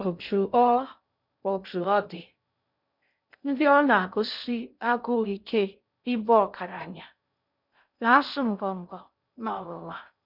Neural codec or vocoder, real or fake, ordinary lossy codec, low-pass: codec, 16 kHz in and 24 kHz out, 0.6 kbps, FocalCodec, streaming, 2048 codes; fake; none; 5.4 kHz